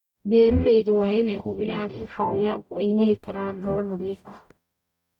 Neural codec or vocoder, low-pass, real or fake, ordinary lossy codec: codec, 44.1 kHz, 0.9 kbps, DAC; 19.8 kHz; fake; none